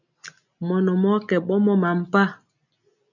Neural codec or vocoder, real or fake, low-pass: none; real; 7.2 kHz